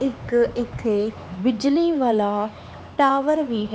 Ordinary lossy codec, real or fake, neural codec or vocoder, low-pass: none; fake; codec, 16 kHz, 4 kbps, X-Codec, HuBERT features, trained on LibriSpeech; none